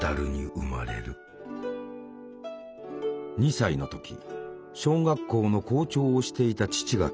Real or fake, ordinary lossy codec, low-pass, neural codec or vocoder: real; none; none; none